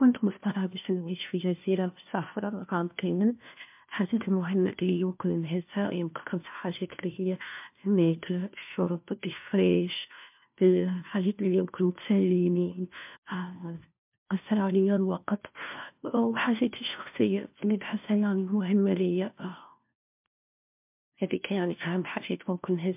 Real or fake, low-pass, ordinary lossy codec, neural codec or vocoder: fake; 3.6 kHz; MP3, 32 kbps; codec, 16 kHz, 1 kbps, FunCodec, trained on LibriTTS, 50 frames a second